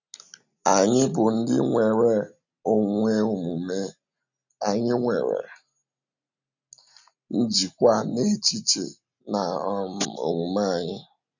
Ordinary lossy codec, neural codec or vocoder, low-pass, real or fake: none; none; 7.2 kHz; real